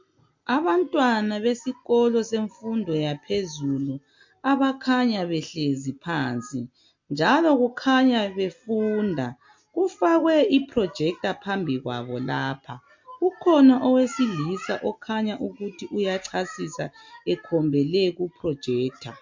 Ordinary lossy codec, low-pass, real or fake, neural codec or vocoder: MP3, 48 kbps; 7.2 kHz; real; none